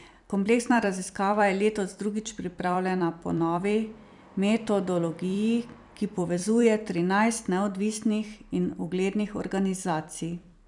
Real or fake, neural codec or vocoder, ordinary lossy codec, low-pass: fake; vocoder, 44.1 kHz, 128 mel bands every 256 samples, BigVGAN v2; none; 10.8 kHz